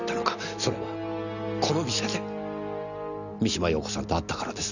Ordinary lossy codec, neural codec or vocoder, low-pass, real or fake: none; none; 7.2 kHz; real